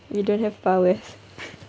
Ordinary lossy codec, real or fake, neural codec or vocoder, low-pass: none; real; none; none